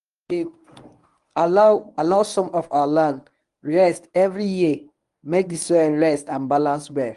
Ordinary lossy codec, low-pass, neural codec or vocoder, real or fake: Opus, 32 kbps; 10.8 kHz; codec, 24 kHz, 0.9 kbps, WavTokenizer, medium speech release version 1; fake